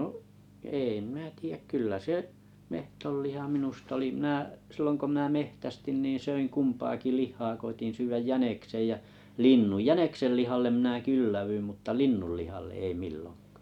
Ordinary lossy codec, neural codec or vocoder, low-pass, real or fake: none; none; 19.8 kHz; real